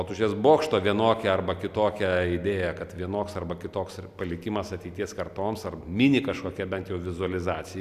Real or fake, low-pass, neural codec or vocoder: real; 14.4 kHz; none